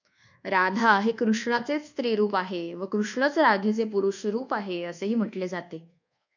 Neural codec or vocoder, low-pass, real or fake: codec, 24 kHz, 1.2 kbps, DualCodec; 7.2 kHz; fake